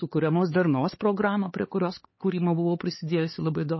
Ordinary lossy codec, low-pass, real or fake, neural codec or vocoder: MP3, 24 kbps; 7.2 kHz; fake; codec, 16 kHz, 4 kbps, X-Codec, HuBERT features, trained on LibriSpeech